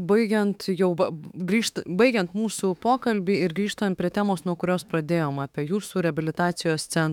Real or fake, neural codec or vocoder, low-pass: fake; autoencoder, 48 kHz, 32 numbers a frame, DAC-VAE, trained on Japanese speech; 19.8 kHz